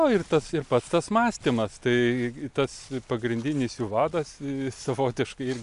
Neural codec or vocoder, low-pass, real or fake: none; 10.8 kHz; real